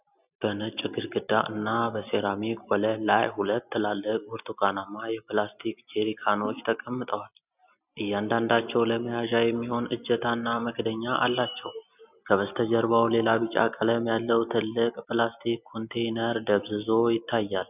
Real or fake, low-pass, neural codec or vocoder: real; 3.6 kHz; none